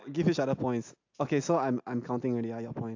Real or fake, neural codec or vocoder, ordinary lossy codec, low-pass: real; none; AAC, 48 kbps; 7.2 kHz